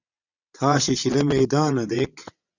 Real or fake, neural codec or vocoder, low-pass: fake; vocoder, 22.05 kHz, 80 mel bands, WaveNeXt; 7.2 kHz